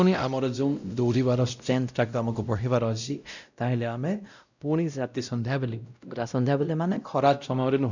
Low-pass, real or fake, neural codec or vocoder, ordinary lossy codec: 7.2 kHz; fake; codec, 16 kHz, 0.5 kbps, X-Codec, WavLM features, trained on Multilingual LibriSpeech; none